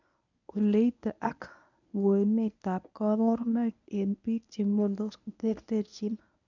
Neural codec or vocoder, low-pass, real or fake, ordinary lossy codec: codec, 24 kHz, 0.9 kbps, WavTokenizer, medium speech release version 1; 7.2 kHz; fake; none